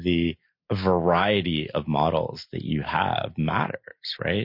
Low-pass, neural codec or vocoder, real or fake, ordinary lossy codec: 5.4 kHz; none; real; MP3, 32 kbps